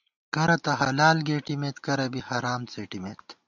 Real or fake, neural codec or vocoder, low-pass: real; none; 7.2 kHz